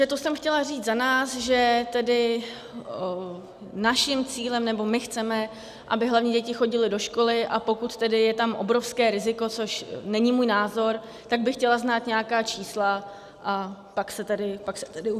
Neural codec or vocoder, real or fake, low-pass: none; real; 14.4 kHz